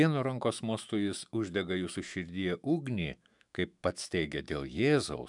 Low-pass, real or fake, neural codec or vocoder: 10.8 kHz; fake; autoencoder, 48 kHz, 128 numbers a frame, DAC-VAE, trained on Japanese speech